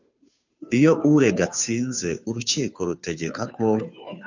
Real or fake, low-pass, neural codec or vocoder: fake; 7.2 kHz; codec, 16 kHz, 2 kbps, FunCodec, trained on Chinese and English, 25 frames a second